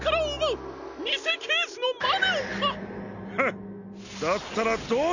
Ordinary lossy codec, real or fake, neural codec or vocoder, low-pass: none; real; none; 7.2 kHz